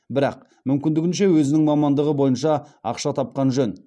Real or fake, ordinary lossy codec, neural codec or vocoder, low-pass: real; none; none; none